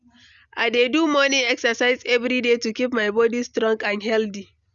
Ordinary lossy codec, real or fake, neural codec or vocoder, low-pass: Opus, 64 kbps; real; none; 7.2 kHz